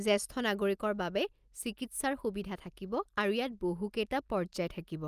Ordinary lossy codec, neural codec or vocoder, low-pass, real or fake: Opus, 32 kbps; none; 14.4 kHz; real